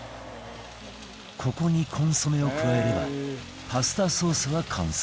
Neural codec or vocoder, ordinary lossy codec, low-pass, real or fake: none; none; none; real